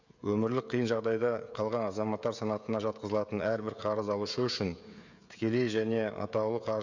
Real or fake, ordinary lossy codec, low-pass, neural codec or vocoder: fake; none; 7.2 kHz; codec, 16 kHz, 16 kbps, FreqCodec, smaller model